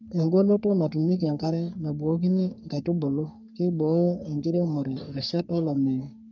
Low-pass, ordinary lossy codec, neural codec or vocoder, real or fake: 7.2 kHz; none; codec, 44.1 kHz, 3.4 kbps, Pupu-Codec; fake